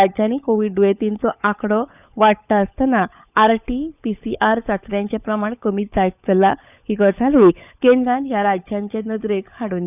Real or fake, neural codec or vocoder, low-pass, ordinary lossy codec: fake; codec, 16 kHz, 16 kbps, FunCodec, trained on LibriTTS, 50 frames a second; 3.6 kHz; none